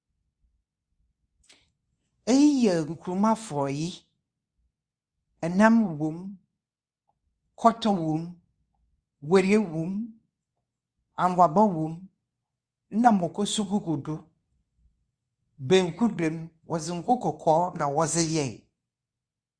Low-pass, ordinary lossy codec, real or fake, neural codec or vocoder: 9.9 kHz; Opus, 64 kbps; fake; codec, 24 kHz, 0.9 kbps, WavTokenizer, medium speech release version 2